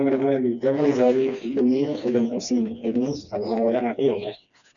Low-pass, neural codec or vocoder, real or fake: 7.2 kHz; codec, 16 kHz, 2 kbps, FreqCodec, smaller model; fake